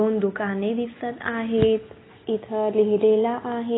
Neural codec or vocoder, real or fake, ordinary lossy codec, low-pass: none; real; AAC, 16 kbps; 7.2 kHz